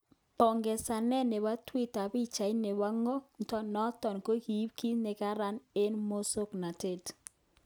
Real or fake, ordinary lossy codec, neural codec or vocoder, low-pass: real; none; none; none